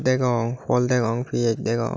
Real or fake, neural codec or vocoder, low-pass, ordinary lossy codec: real; none; none; none